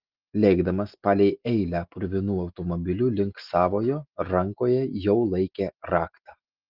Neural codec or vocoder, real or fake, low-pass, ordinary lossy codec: none; real; 5.4 kHz; Opus, 32 kbps